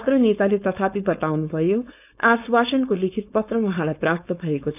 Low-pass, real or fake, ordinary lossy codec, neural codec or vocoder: 3.6 kHz; fake; none; codec, 16 kHz, 4.8 kbps, FACodec